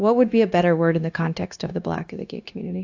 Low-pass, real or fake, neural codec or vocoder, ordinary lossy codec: 7.2 kHz; fake; codec, 16 kHz, 0.9 kbps, LongCat-Audio-Codec; AAC, 48 kbps